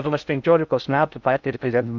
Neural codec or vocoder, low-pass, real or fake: codec, 16 kHz in and 24 kHz out, 0.6 kbps, FocalCodec, streaming, 2048 codes; 7.2 kHz; fake